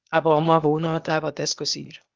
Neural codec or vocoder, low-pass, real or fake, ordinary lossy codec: codec, 16 kHz, 0.8 kbps, ZipCodec; 7.2 kHz; fake; Opus, 32 kbps